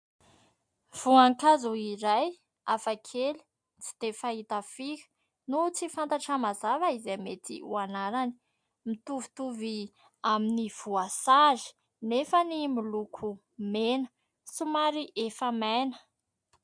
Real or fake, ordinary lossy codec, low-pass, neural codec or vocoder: real; MP3, 64 kbps; 9.9 kHz; none